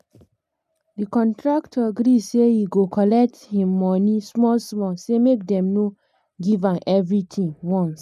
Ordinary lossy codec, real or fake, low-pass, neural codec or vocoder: none; real; 14.4 kHz; none